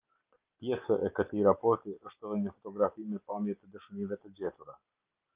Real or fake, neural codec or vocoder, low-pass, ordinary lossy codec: real; none; 3.6 kHz; Opus, 24 kbps